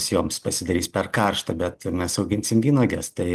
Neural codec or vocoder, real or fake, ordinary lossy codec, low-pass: none; real; Opus, 32 kbps; 14.4 kHz